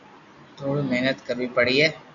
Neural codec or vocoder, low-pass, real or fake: none; 7.2 kHz; real